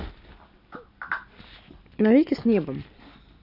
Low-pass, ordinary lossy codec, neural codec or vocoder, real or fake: 5.4 kHz; none; none; real